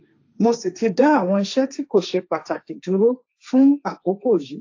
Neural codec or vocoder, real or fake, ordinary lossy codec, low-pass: codec, 16 kHz, 1.1 kbps, Voila-Tokenizer; fake; AAC, 48 kbps; 7.2 kHz